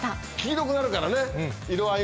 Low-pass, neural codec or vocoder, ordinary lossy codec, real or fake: none; none; none; real